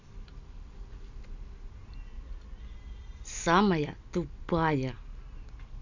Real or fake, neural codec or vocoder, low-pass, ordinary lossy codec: real; none; 7.2 kHz; none